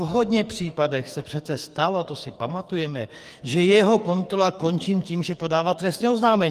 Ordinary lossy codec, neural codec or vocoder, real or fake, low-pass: Opus, 32 kbps; codec, 44.1 kHz, 2.6 kbps, SNAC; fake; 14.4 kHz